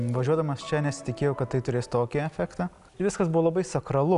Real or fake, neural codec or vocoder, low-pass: real; none; 10.8 kHz